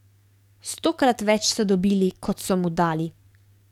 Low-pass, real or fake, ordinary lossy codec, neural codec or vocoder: 19.8 kHz; fake; none; codec, 44.1 kHz, 7.8 kbps, DAC